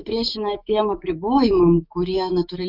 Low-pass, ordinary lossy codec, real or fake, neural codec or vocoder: 5.4 kHz; Opus, 64 kbps; fake; autoencoder, 48 kHz, 128 numbers a frame, DAC-VAE, trained on Japanese speech